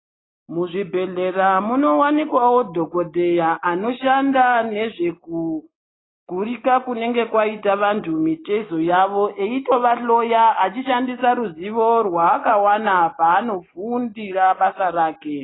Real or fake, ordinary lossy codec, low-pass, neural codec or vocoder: real; AAC, 16 kbps; 7.2 kHz; none